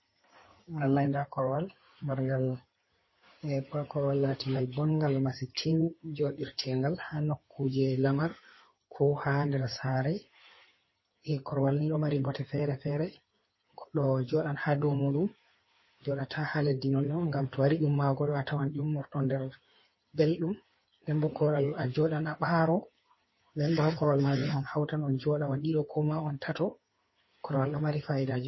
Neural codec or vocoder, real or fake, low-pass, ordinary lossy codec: codec, 16 kHz in and 24 kHz out, 2.2 kbps, FireRedTTS-2 codec; fake; 7.2 kHz; MP3, 24 kbps